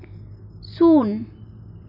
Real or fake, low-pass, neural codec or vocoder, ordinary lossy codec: real; 5.4 kHz; none; none